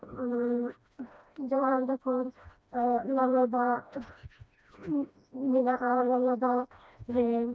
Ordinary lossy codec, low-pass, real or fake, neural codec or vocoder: none; none; fake; codec, 16 kHz, 1 kbps, FreqCodec, smaller model